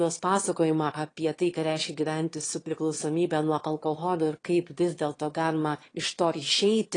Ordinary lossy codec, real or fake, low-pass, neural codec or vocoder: AAC, 32 kbps; fake; 9.9 kHz; autoencoder, 22.05 kHz, a latent of 192 numbers a frame, VITS, trained on one speaker